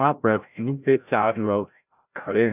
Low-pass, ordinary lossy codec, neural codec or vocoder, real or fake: 3.6 kHz; none; codec, 16 kHz, 0.5 kbps, FreqCodec, larger model; fake